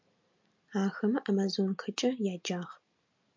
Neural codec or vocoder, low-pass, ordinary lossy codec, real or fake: none; 7.2 kHz; MP3, 64 kbps; real